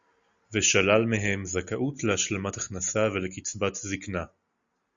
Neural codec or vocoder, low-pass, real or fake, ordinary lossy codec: none; 7.2 kHz; real; Opus, 64 kbps